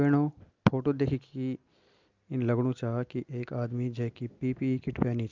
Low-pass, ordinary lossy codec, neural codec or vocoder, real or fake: 7.2 kHz; Opus, 24 kbps; none; real